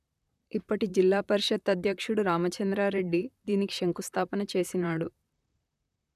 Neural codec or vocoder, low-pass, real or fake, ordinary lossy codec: vocoder, 44.1 kHz, 128 mel bands, Pupu-Vocoder; 14.4 kHz; fake; none